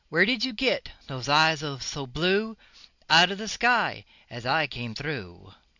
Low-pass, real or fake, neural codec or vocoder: 7.2 kHz; real; none